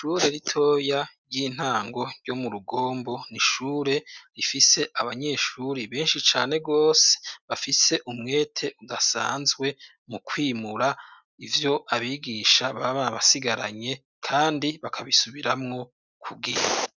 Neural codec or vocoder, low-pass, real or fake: none; 7.2 kHz; real